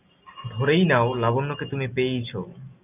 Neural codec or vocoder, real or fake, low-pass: none; real; 3.6 kHz